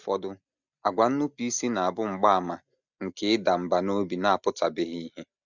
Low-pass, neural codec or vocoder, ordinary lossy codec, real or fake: 7.2 kHz; none; none; real